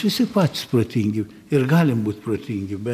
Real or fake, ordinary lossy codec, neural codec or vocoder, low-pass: real; AAC, 96 kbps; none; 14.4 kHz